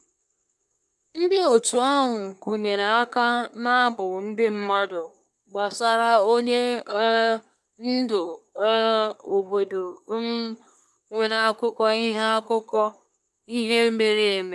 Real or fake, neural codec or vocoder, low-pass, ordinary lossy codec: fake; codec, 24 kHz, 1 kbps, SNAC; none; none